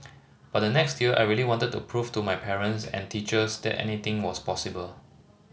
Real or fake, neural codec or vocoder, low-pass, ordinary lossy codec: real; none; none; none